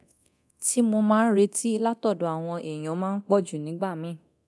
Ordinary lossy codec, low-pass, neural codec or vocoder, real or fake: none; none; codec, 24 kHz, 0.9 kbps, DualCodec; fake